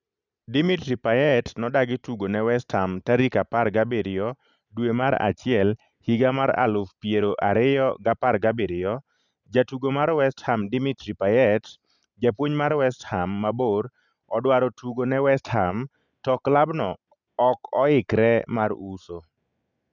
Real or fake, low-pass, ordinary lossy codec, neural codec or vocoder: real; 7.2 kHz; none; none